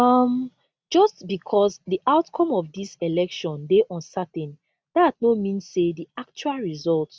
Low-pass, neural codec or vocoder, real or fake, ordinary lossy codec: none; none; real; none